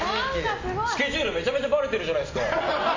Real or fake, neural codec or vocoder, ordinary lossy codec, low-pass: real; none; MP3, 32 kbps; 7.2 kHz